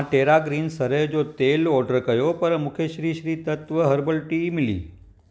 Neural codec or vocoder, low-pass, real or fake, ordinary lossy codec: none; none; real; none